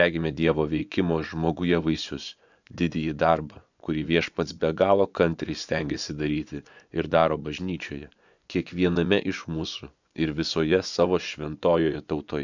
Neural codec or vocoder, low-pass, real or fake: vocoder, 24 kHz, 100 mel bands, Vocos; 7.2 kHz; fake